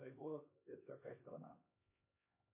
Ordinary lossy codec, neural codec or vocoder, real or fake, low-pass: MP3, 24 kbps; codec, 16 kHz, 2 kbps, X-Codec, HuBERT features, trained on LibriSpeech; fake; 3.6 kHz